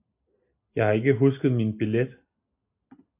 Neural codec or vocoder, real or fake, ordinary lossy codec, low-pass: none; real; AAC, 24 kbps; 3.6 kHz